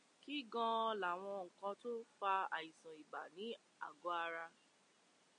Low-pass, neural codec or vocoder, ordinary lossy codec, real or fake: 9.9 kHz; none; MP3, 48 kbps; real